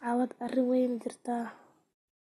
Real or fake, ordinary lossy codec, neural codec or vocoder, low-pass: real; AAC, 32 kbps; none; 19.8 kHz